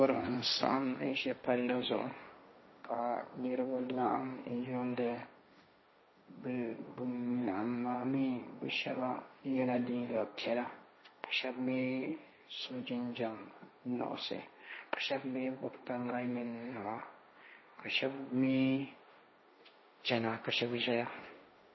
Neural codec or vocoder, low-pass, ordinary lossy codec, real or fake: codec, 16 kHz, 1.1 kbps, Voila-Tokenizer; 7.2 kHz; MP3, 24 kbps; fake